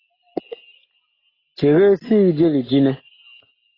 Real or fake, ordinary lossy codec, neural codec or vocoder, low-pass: real; AAC, 24 kbps; none; 5.4 kHz